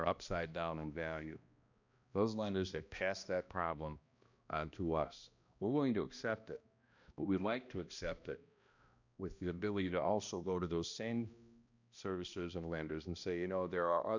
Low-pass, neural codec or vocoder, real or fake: 7.2 kHz; codec, 16 kHz, 1 kbps, X-Codec, HuBERT features, trained on balanced general audio; fake